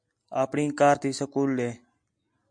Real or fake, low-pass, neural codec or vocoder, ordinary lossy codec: real; 9.9 kHz; none; MP3, 64 kbps